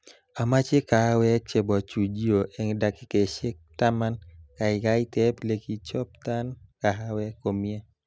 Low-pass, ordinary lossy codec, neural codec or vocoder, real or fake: none; none; none; real